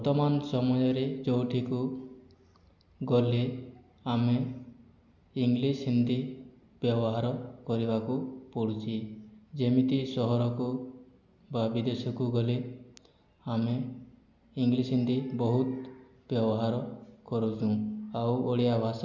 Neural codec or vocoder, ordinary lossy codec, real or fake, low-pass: none; none; real; 7.2 kHz